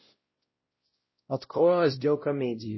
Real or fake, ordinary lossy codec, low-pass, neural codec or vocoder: fake; MP3, 24 kbps; 7.2 kHz; codec, 16 kHz, 0.5 kbps, X-Codec, WavLM features, trained on Multilingual LibriSpeech